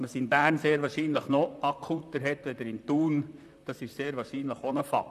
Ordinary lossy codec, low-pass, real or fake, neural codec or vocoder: none; 14.4 kHz; fake; vocoder, 44.1 kHz, 128 mel bands, Pupu-Vocoder